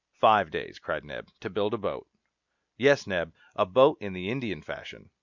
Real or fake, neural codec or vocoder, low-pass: real; none; 7.2 kHz